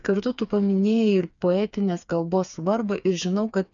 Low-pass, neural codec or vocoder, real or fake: 7.2 kHz; codec, 16 kHz, 4 kbps, FreqCodec, smaller model; fake